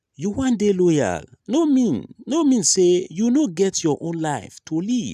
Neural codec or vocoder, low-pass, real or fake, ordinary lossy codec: none; 14.4 kHz; real; none